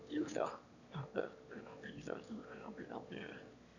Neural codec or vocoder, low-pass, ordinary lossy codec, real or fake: autoencoder, 22.05 kHz, a latent of 192 numbers a frame, VITS, trained on one speaker; 7.2 kHz; none; fake